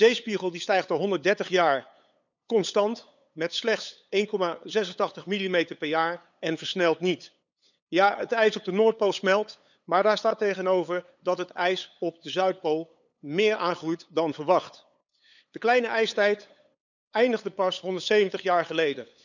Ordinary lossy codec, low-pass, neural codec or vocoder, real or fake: none; 7.2 kHz; codec, 16 kHz, 8 kbps, FunCodec, trained on LibriTTS, 25 frames a second; fake